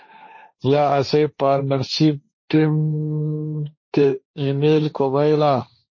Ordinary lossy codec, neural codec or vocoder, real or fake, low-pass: MP3, 32 kbps; codec, 16 kHz, 1.1 kbps, Voila-Tokenizer; fake; 7.2 kHz